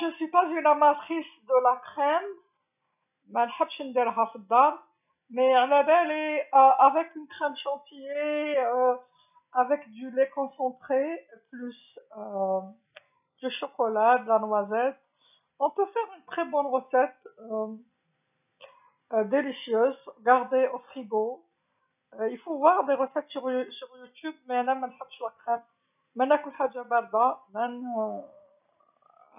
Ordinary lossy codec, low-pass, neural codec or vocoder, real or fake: none; 3.6 kHz; none; real